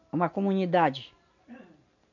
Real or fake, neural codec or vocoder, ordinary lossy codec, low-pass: real; none; none; 7.2 kHz